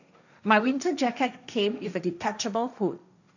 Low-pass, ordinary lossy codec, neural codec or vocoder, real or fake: 7.2 kHz; none; codec, 16 kHz, 1.1 kbps, Voila-Tokenizer; fake